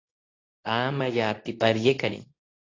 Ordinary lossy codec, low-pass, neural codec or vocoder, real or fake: AAC, 32 kbps; 7.2 kHz; codec, 24 kHz, 0.9 kbps, WavTokenizer, medium speech release version 2; fake